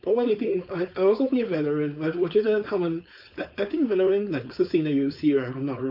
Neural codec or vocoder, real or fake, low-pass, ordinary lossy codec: codec, 16 kHz, 4.8 kbps, FACodec; fake; 5.4 kHz; none